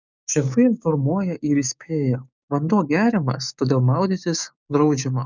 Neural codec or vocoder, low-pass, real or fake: codec, 16 kHz, 6 kbps, DAC; 7.2 kHz; fake